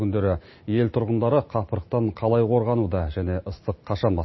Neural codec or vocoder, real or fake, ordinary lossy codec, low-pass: none; real; MP3, 24 kbps; 7.2 kHz